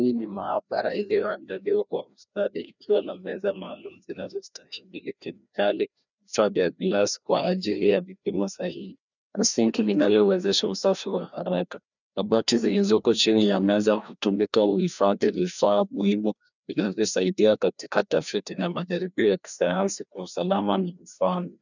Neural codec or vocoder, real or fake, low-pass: codec, 16 kHz, 1 kbps, FreqCodec, larger model; fake; 7.2 kHz